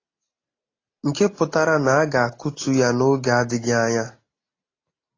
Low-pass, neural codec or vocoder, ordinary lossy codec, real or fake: 7.2 kHz; none; AAC, 32 kbps; real